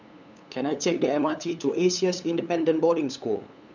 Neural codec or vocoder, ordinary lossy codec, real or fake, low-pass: codec, 16 kHz, 8 kbps, FunCodec, trained on LibriTTS, 25 frames a second; none; fake; 7.2 kHz